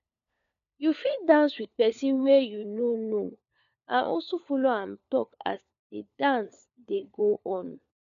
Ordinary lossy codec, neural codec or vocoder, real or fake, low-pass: none; codec, 16 kHz, 4 kbps, FunCodec, trained on LibriTTS, 50 frames a second; fake; 7.2 kHz